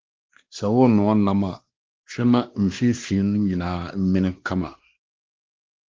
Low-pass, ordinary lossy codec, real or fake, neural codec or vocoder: 7.2 kHz; Opus, 16 kbps; fake; codec, 16 kHz, 2 kbps, X-Codec, WavLM features, trained on Multilingual LibriSpeech